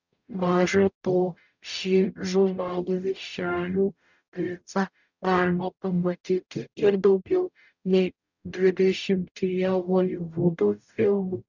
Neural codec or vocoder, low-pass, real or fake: codec, 44.1 kHz, 0.9 kbps, DAC; 7.2 kHz; fake